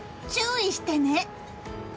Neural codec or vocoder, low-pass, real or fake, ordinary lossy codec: none; none; real; none